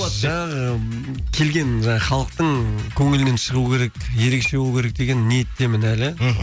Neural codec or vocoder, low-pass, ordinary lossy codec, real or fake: none; none; none; real